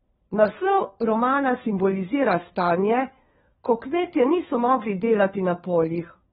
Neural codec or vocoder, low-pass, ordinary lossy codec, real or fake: codec, 16 kHz, 4 kbps, FunCodec, trained on LibriTTS, 50 frames a second; 7.2 kHz; AAC, 16 kbps; fake